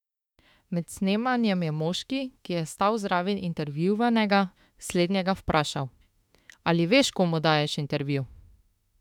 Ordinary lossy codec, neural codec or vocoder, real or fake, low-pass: none; autoencoder, 48 kHz, 32 numbers a frame, DAC-VAE, trained on Japanese speech; fake; 19.8 kHz